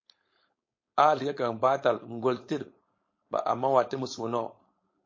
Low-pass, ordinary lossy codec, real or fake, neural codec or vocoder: 7.2 kHz; MP3, 32 kbps; fake; codec, 16 kHz, 4.8 kbps, FACodec